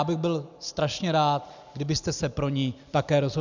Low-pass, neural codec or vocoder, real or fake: 7.2 kHz; none; real